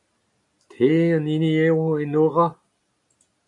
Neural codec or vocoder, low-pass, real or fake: none; 10.8 kHz; real